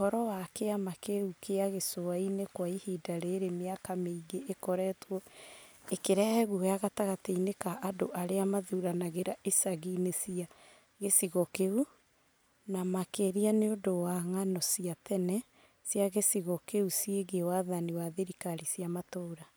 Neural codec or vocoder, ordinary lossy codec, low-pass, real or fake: none; none; none; real